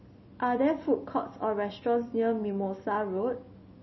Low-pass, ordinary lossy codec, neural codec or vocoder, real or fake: 7.2 kHz; MP3, 24 kbps; none; real